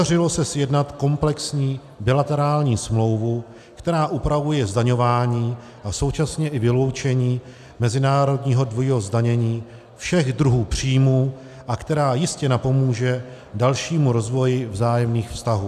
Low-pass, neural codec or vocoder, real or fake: 14.4 kHz; none; real